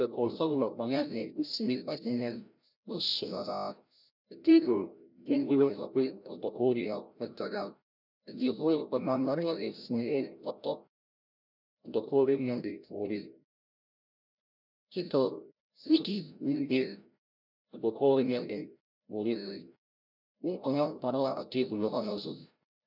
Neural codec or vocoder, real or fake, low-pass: codec, 16 kHz, 0.5 kbps, FreqCodec, larger model; fake; 5.4 kHz